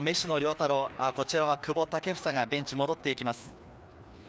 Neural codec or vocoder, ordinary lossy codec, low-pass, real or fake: codec, 16 kHz, 2 kbps, FreqCodec, larger model; none; none; fake